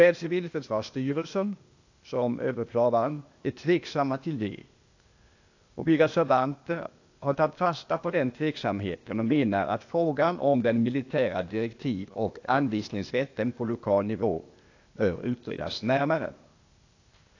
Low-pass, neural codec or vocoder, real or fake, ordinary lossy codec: 7.2 kHz; codec, 16 kHz, 0.8 kbps, ZipCodec; fake; AAC, 48 kbps